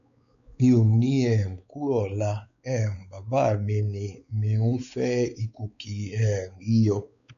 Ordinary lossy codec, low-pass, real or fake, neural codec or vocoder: none; 7.2 kHz; fake; codec, 16 kHz, 4 kbps, X-Codec, WavLM features, trained on Multilingual LibriSpeech